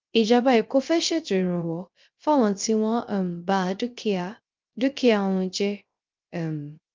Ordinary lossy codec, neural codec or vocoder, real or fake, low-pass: Opus, 24 kbps; codec, 16 kHz, 0.3 kbps, FocalCodec; fake; 7.2 kHz